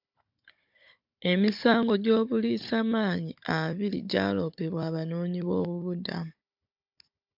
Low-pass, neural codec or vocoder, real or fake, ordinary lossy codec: 5.4 kHz; codec, 16 kHz, 16 kbps, FunCodec, trained on Chinese and English, 50 frames a second; fake; MP3, 48 kbps